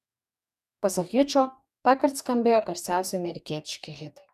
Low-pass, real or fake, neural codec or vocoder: 14.4 kHz; fake; codec, 44.1 kHz, 2.6 kbps, DAC